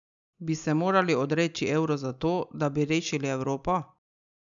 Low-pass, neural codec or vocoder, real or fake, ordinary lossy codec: 7.2 kHz; none; real; MP3, 96 kbps